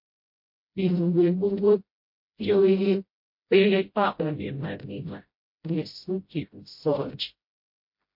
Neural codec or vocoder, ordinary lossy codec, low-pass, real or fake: codec, 16 kHz, 0.5 kbps, FreqCodec, smaller model; MP3, 32 kbps; 5.4 kHz; fake